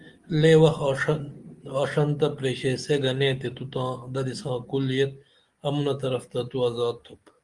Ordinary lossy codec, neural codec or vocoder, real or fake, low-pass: Opus, 24 kbps; none; real; 10.8 kHz